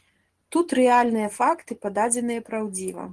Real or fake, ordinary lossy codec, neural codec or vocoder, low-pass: real; Opus, 16 kbps; none; 10.8 kHz